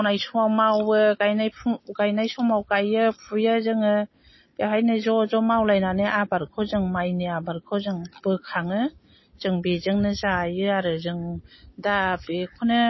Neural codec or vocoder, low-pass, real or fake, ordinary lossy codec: none; 7.2 kHz; real; MP3, 24 kbps